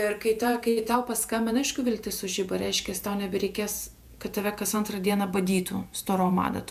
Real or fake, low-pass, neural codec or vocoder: fake; 14.4 kHz; vocoder, 48 kHz, 128 mel bands, Vocos